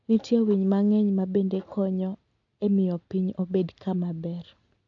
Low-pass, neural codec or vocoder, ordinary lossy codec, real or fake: 7.2 kHz; none; AAC, 48 kbps; real